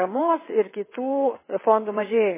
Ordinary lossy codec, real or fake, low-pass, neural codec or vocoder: MP3, 16 kbps; fake; 3.6 kHz; vocoder, 22.05 kHz, 80 mel bands, WaveNeXt